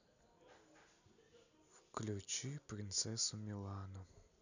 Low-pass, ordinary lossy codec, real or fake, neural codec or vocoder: 7.2 kHz; none; real; none